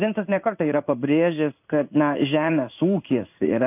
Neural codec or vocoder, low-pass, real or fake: codec, 16 kHz in and 24 kHz out, 1 kbps, XY-Tokenizer; 3.6 kHz; fake